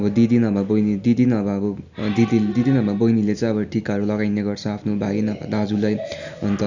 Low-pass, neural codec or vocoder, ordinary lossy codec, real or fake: 7.2 kHz; none; none; real